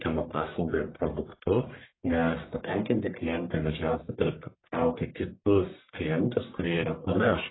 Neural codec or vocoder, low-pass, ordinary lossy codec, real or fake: codec, 44.1 kHz, 1.7 kbps, Pupu-Codec; 7.2 kHz; AAC, 16 kbps; fake